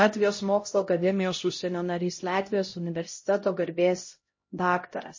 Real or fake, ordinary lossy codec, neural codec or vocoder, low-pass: fake; MP3, 32 kbps; codec, 16 kHz, 0.5 kbps, X-Codec, HuBERT features, trained on LibriSpeech; 7.2 kHz